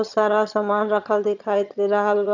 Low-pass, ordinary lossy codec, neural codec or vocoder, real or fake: 7.2 kHz; none; vocoder, 22.05 kHz, 80 mel bands, HiFi-GAN; fake